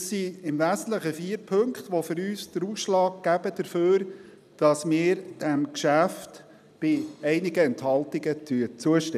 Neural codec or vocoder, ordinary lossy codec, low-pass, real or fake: none; none; 14.4 kHz; real